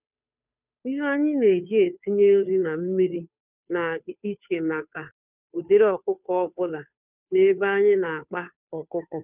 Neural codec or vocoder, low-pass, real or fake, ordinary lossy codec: codec, 16 kHz, 2 kbps, FunCodec, trained on Chinese and English, 25 frames a second; 3.6 kHz; fake; none